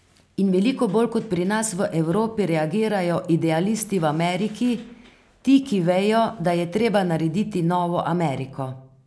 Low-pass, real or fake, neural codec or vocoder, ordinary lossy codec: none; real; none; none